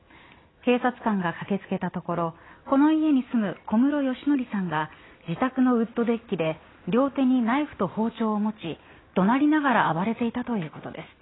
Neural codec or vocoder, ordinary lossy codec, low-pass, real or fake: none; AAC, 16 kbps; 7.2 kHz; real